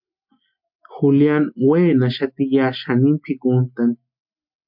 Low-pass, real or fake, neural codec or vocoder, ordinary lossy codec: 5.4 kHz; real; none; MP3, 48 kbps